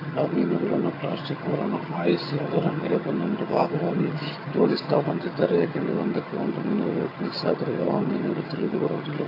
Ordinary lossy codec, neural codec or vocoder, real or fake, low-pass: AAC, 24 kbps; vocoder, 22.05 kHz, 80 mel bands, HiFi-GAN; fake; 5.4 kHz